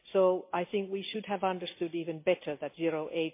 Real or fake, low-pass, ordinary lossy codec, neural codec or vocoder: real; 3.6 kHz; MP3, 24 kbps; none